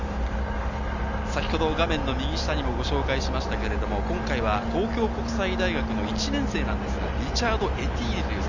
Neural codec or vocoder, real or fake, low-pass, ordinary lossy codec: none; real; 7.2 kHz; none